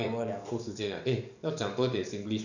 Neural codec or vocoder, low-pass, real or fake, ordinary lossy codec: codec, 44.1 kHz, 7.8 kbps, DAC; 7.2 kHz; fake; none